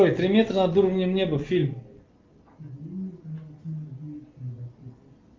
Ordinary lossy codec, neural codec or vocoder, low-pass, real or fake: Opus, 24 kbps; none; 7.2 kHz; real